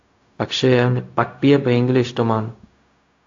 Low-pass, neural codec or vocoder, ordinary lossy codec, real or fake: 7.2 kHz; codec, 16 kHz, 0.4 kbps, LongCat-Audio-Codec; AAC, 48 kbps; fake